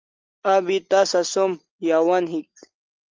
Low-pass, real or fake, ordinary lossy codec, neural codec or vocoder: 7.2 kHz; real; Opus, 24 kbps; none